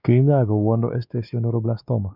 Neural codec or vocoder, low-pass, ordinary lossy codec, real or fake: codec, 16 kHz, 2 kbps, X-Codec, WavLM features, trained on Multilingual LibriSpeech; 5.4 kHz; none; fake